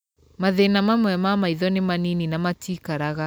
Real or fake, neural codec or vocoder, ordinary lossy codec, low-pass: real; none; none; none